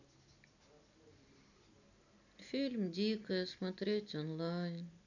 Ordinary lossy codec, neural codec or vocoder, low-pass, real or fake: none; none; 7.2 kHz; real